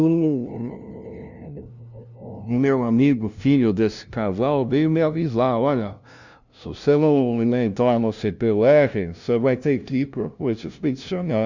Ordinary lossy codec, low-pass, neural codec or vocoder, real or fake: Opus, 64 kbps; 7.2 kHz; codec, 16 kHz, 0.5 kbps, FunCodec, trained on LibriTTS, 25 frames a second; fake